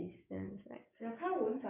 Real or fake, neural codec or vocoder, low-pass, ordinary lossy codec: fake; codec, 44.1 kHz, 7.8 kbps, DAC; 3.6 kHz; none